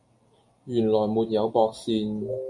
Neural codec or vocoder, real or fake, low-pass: none; real; 10.8 kHz